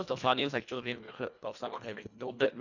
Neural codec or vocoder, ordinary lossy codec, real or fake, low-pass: codec, 24 kHz, 1.5 kbps, HILCodec; none; fake; 7.2 kHz